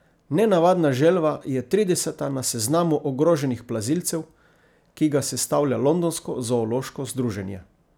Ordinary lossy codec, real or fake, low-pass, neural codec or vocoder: none; real; none; none